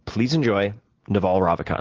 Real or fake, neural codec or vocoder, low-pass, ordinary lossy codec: real; none; 7.2 kHz; Opus, 16 kbps